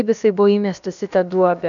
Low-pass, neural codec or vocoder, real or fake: 7.2 kHz; codec, 16 kHz, about 1 kbps, DyCAST, with the encoder's durations; fake